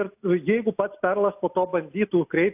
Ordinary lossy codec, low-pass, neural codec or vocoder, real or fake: AAC, 32 kbps; 3.6 kHz; none; real